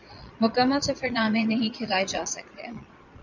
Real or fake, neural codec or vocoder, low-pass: fake; vocoder, 22.05 kHz, 80 mel bands, Vocos; 7.2 kHz